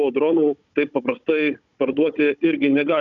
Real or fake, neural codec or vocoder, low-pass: fake; codec, 16 kHz, 16 kbps, FunCodec, trained on Chinese and English, 50 frames a second; 7.2 kHz